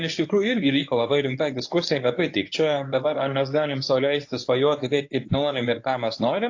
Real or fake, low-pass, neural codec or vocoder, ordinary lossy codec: fake; 7.2 kHz; codec, 24 kHz, 0.9 kbps, WavTokenizer, medium speech release version 1; AAC, 48 kbps